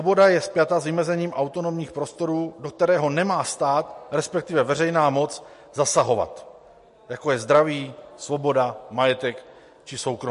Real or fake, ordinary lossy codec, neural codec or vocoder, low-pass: real; MP3, 48 kbps; none; 14.4 kHz